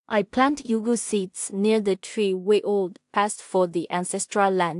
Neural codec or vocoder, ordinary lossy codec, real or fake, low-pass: codec, 16 kHz in and 24 kHz out, 0.4 kbps, LongCat-Audio-Codec, two codebook decoder; AAC, 64 kbps; fake; 10.8 kHz